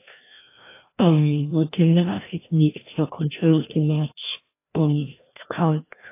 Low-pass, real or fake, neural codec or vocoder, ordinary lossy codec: 3.6 kHz; fake; codec, 16 kHz, 1 kbps, FreqCodec, larger model; AAC, 24 kbps